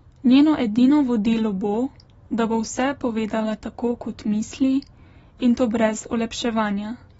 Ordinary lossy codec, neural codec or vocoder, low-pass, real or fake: AAC, 24 kbps; none; 19.8 kHz; real